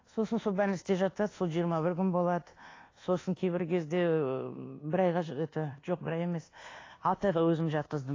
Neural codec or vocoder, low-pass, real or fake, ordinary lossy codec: codec, 24 kHz, 1.2 kbps, DualCodec; 7.2 kHz; fake; AAC, 32 kbps